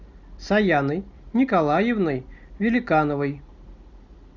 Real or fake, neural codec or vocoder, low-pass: real; none; 7.2 kHz